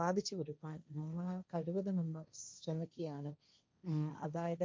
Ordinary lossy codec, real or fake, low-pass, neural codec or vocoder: none; fake; none; codec, 16 kHz, 1.1 kbps, Voila-Tokenizer